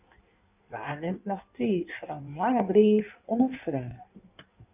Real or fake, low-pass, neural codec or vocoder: fake; 3.6 kHz; codec, 16 kHz in and 24 kHz out, 1.1 kbps, FireRedTTS-2 codec